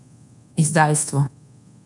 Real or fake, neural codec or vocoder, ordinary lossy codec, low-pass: fake; codec, 24 kHz, 1.2 kbps, DualCodec; none; 10.8 kHz